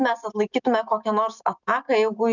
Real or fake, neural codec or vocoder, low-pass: real; none; 7.2 kHz